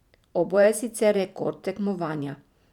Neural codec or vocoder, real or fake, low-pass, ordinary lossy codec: vocoder, 44.1 kHz, 128 mel bands every 512 samples, BigVGAN v2; fake; 19.8 kHz; none